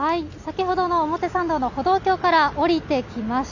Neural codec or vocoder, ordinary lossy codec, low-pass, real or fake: none; none; 7.2 kHz; real